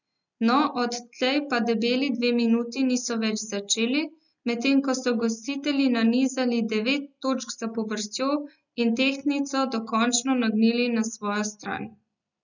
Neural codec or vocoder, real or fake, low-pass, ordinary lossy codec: none; real; 7.2 kHz; none